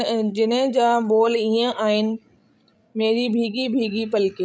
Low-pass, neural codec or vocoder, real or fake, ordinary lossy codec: none; codec, 16 kHz, 16 kbps, FreqCodec, larger model; fake; none